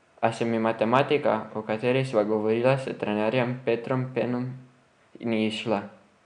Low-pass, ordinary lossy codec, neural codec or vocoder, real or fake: 9.9 kHz; MP3, 96 kbps; none; real